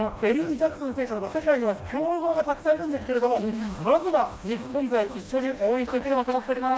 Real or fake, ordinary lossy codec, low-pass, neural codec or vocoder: fake; none; none; codec, 16 kHz, 1 kbps, FreqCodec, smaller model